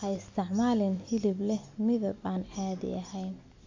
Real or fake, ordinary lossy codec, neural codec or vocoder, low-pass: real; MP3, 48 kbps; none; 7.2 kHz